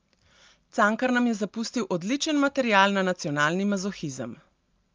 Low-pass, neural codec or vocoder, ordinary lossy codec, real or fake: 7.2 kHz; none; Opus, 32 kbps; real